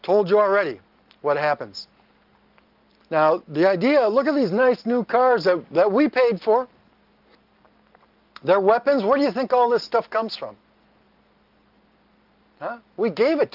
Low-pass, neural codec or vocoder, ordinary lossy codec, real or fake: 5.4 kHz; none; Opus, 32 kbps; real